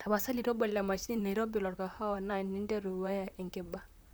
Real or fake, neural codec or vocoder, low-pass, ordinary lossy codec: fake; vocoder, 44.1 kHz, 128 mel bands, Pupu-Vocoder; none; none